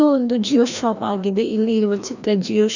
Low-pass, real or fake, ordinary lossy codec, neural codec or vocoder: 7.2 kHz; fake; none; codec, 16 kHz, 1 kbps, FreqCodec, larger model